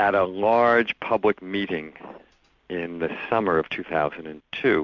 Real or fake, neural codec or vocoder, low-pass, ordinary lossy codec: real; none; 7.2 kHz; MP3, 64 kbps